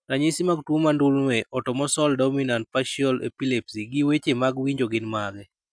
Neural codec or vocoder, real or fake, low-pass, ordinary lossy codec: none; real; 9.9 kHz; none